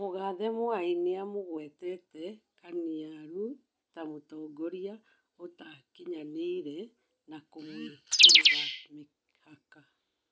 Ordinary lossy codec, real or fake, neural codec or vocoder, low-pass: none; real; none; none